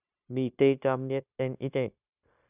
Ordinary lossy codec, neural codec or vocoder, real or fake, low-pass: none; codec, 16 kHz, 0.9 kbps, LongCat-Audio-Codec; fake; 3.6 kHz